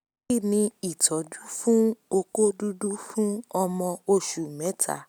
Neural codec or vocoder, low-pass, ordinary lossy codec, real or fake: none; none; none; real